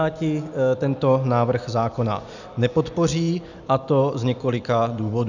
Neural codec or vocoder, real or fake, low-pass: none; real; 7.2 kHz